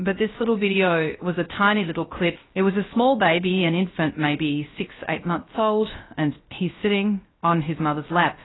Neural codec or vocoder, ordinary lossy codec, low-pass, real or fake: codec, 16 kHz, 0.3 kbps, FocalCodec; AAC, 16 kbps; 7.2 kHz; fake